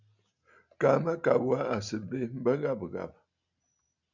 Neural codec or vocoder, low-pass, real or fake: none; 7.2 kHz; real